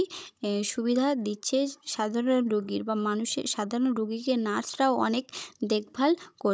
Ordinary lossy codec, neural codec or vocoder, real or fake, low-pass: none; codec, 16 kHz, 16 kbps, FunCodec, trained on Chinese and English, 50 frames a second; fake; none